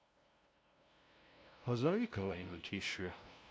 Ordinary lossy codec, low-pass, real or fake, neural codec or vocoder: none; none; fake; codec, 16 kHz, 0.5 kbps, FunCodec, trained on LibriTTS, 25 frames a second